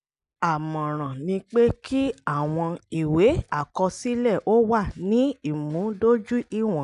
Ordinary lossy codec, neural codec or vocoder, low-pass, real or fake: none; none; 10.8 kHz; real